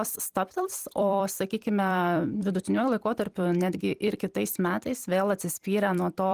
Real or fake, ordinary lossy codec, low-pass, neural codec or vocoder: fake; Opus, 24 kbps; 14.4 kHz; vocoder, 44.1 kHz, 128 mel bands every 256 samples, BigVGAN v2